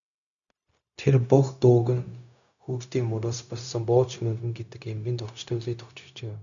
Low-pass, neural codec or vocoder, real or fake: 7.2 kHz; codec, 16 kHz, 0.4 kbps, LongCat-Audio-Codec; fake